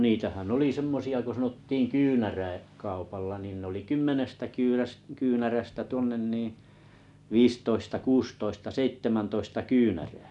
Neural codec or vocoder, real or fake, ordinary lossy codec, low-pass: none; real; none; 10.8 kHz